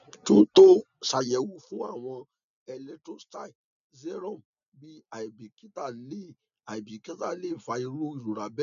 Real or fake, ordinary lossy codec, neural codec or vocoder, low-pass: real; none; none; 7.2 kHz